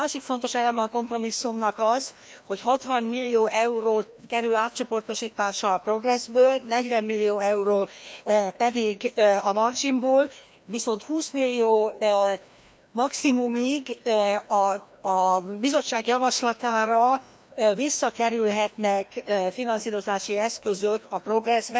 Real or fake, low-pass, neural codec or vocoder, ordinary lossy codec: fake; none; codec, 16 kHz, 1 kbps, FreqCodec, larger model; none